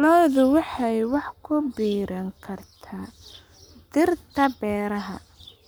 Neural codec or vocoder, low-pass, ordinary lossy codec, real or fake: codec, 44.1 kHz, 7.8 kbps, Pupu-Codec; none; none; fake